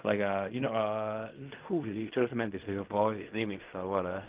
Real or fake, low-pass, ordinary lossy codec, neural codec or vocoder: fake; 3.6 kHz; Opus, 32 kbps; codec, 16 kHz in and 24 kHz out, 0.4 kbps, LongCat-Audio-Codec, fine tuned four codebook decoder